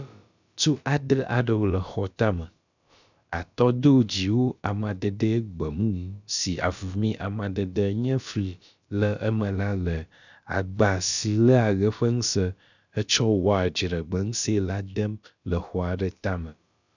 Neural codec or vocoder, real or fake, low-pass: codec, 16 kHz, about 1 kbps, DyCAST, with the encoder's durations; fake; 7.2 kHz